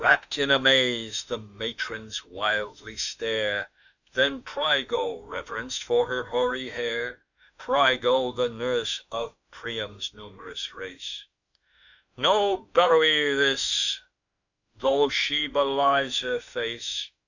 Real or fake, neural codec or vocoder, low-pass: fake; autoencoder, 48 kHz, 32 numbers a frame, DAC-VAE, trained on Japanese speech; 7.2 kHz